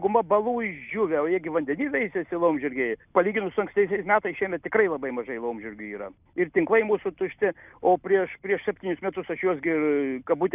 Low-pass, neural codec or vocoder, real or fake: 3.6 kHz; none; real